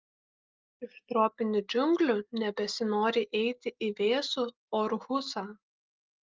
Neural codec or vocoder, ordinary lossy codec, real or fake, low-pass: none; Opus, 32 kbps; real; 7.2 kHz